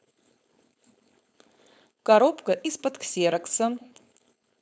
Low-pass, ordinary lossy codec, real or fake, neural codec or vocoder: none; none; fake; codec, 16 kHz, 4.8 kbps, FACodec